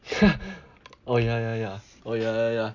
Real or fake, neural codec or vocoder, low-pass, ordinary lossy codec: real; none; 7.2 kHz; none